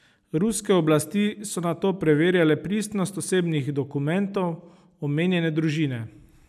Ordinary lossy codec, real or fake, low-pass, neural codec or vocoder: none; real; 14.4 kHz; none